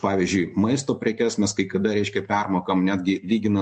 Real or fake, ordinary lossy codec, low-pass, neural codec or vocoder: real; MP3, 48 kbps; 10.8 kHz; none